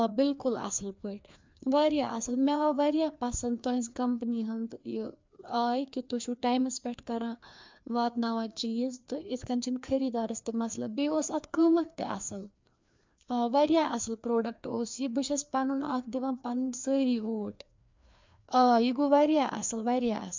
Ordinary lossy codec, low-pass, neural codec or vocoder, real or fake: MP3, 64 kbps; 7.2 kHz; codec, 16 kHz, 2 kbps, FreqCodec, larger model; fake